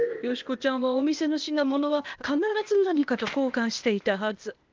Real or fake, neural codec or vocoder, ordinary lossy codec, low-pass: fake; codec, 16 kHz, 0.8 kbps, ZipCodec; Opus, 32 kbps; 7.2 kHz